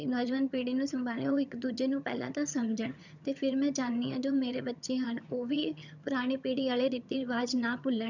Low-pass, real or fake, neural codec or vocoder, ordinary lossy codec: 7.2 kHz; fake; vocoder, 22.05 kHz, 80 mel bands, HiFi-GAN; none